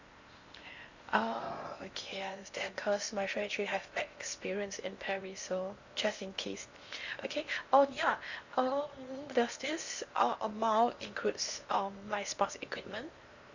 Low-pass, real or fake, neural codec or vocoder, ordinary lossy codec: 7.2 kHz; fake; codec, 16 kHz in and 24 kHz out, 0.8 kbps, FocalCodec, streaming, 65536 codes; Opus, 64 kbps